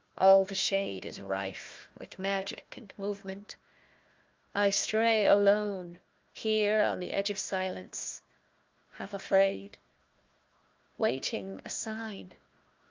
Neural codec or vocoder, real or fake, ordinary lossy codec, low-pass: codec, 16 kHz, 1 kbps, FunCodec, trained on Chinese and English, 50 frames a second; fake; Opus, 24 kbps; 7.2 kHz